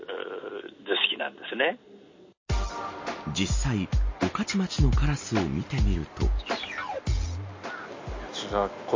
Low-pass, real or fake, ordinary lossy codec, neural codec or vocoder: 7.2 kHz; real; MP3, 32 kbps; none